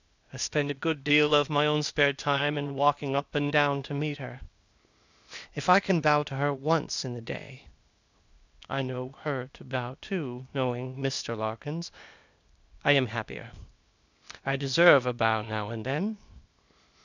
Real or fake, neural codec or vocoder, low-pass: fake; codec, 16 kHz, 0.8 kbps, ZipCodec; 7.2 kHz